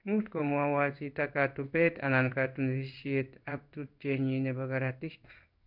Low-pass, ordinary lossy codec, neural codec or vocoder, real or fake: 5.4 kHz; none; codec, 16 kHz in and 24 kHz out, 1 kbps, XY-Tokenizer; fake